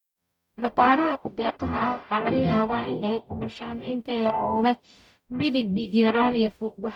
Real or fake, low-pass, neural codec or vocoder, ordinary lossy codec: fake; 19.8 kHz; codec, 44.1 kHz, 0.9 kbps, DAC; none